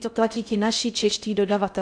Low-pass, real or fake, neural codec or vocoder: 9.9 kHz; fake; codec, 16 kHz in and 24 kHz out, 0.6 kbps, FocalCodec, streaming, 2048 codes